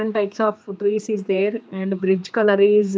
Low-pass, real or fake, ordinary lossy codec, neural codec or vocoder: none; fake; none; codec, 16 kHz, 2 kbps, X-Codec, HuBERT features, trained on general audio